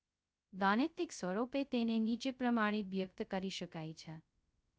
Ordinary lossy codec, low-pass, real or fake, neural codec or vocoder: none; none; fake; codec, 16 kHz, 0.2 kbps, FocalCodec